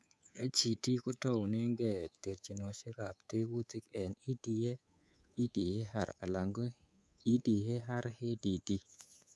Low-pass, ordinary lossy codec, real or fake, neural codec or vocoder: none; none; fake; codec, 24 kHz, 3.1 kbps, DualCodec